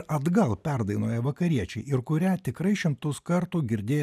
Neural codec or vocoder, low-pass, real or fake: none; 14.4 kHz; real